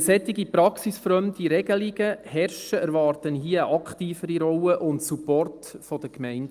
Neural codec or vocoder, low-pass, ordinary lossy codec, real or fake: none; 14.4 kHz; Opus, 32 kbps; real